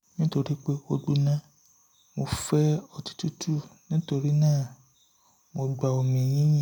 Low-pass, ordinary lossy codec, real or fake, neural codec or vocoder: none; none; real; none